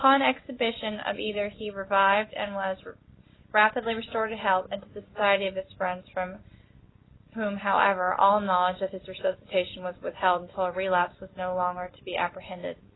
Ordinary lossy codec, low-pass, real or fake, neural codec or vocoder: AAC, 16 kbps; 7.2 kHz; real; none